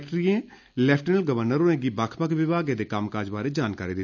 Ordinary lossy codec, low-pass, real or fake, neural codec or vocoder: none; 7.2 kHz; real; none